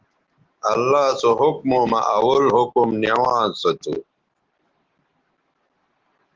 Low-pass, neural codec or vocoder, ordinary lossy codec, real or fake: 7.2 kHz; none; Opus, 16 kbps; real